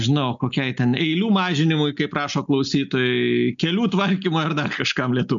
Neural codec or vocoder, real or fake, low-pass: none; real; 7.2 kHz